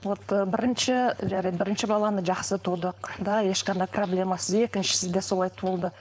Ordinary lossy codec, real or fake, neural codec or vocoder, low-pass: none; fake; codec, 16 kHz, 4.8 kbps, FACodec; none